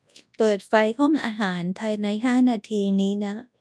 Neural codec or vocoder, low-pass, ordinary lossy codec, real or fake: codec, 24 kHz, 0.9 kbps, WavTokenizer, large speech release; none; none; fake